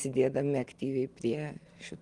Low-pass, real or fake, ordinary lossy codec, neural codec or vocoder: 10.8 kHz; real; Opus, 24 kbps; none